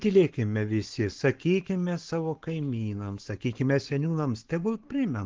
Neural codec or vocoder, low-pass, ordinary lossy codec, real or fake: codec, 44.1 kHz, 7.8 kbps, Pupu-Codec; 7.2 kHz; Opus, 16 kbps; fake